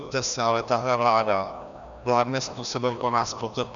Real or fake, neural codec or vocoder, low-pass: fake; codec, 16 kHz, 1 kbps, FreqCodec, larger model; 7.2 kHz